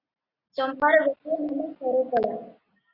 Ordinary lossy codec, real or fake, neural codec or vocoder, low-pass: Opus, 64 kbps; real; none; 5.4 kHz